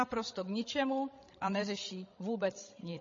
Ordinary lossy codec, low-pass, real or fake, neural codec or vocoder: MP3, 32 kbps; 7.2 kHz; fake; codec, 16 kHz, 16 kbps, FreqCodec, larger model